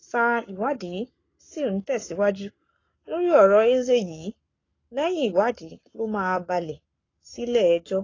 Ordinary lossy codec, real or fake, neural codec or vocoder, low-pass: AAC, 32 kbps; fake; codec, 44.1 kHz, 7.8 kbps, Pupu-Codec; 7.2 kHz